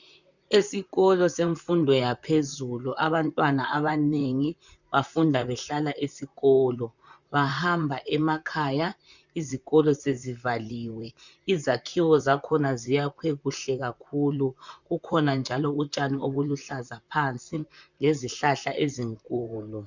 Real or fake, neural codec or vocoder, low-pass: fake; vocoder, 44.1 kHz, 128 mel bands, Pupu-Vocoder; 7.2 kHz